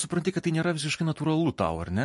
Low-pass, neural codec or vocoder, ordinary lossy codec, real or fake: 14.4 kHz; none; MP3, 48 kbps; real